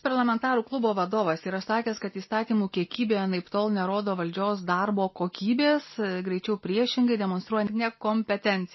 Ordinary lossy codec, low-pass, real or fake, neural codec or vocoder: MP3, 24 kbps; 7.2 kHz; real; none